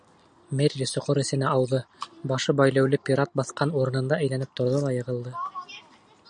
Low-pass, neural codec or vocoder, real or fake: 9.9 kHz; none; real